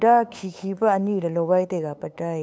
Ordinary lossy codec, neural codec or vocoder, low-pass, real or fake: none; codec, 16 kHz, 8 kbps, FunCodec, trained on LibriTTS, 25 frames a second; none; fake